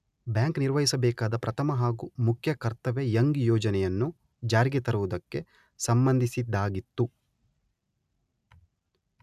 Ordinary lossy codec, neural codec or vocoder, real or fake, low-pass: none; none; real; 14.4 kHz